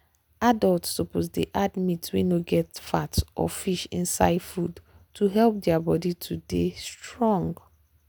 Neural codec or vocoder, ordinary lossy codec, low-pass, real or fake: none; none; none; real